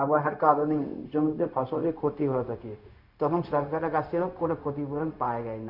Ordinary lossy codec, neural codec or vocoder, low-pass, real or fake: none; codec, 16 kHz, 0.4 kbps, LongCat-Audio-Codec; 5.4 kHz; fake